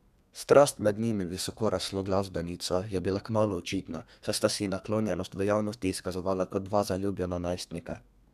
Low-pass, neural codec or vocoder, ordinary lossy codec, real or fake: 14.4 kHz; codec, 32 kHz, 1.9 kbps, SNAC; none; fake